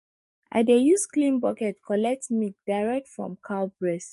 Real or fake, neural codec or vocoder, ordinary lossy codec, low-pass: fake; codec, 44.1 kHz, 7.8 kbps, DAC; MP3, 48 kbps; 14.4 kHz